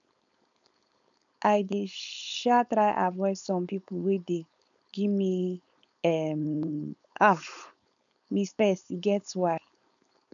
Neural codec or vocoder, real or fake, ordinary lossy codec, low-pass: codec, 16 kHz, 4.8 kbps, FACodec; fake; none; 7.2 kHz